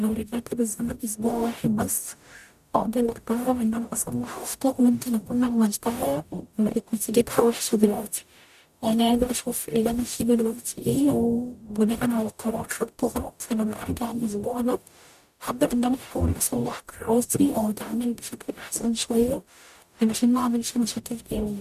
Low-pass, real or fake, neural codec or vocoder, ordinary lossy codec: 14.4 kHz; fake; codec, 44.1 kHz, 0.9 kbps, DAC; none